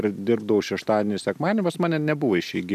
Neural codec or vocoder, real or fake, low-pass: none; real; 14.4 kHz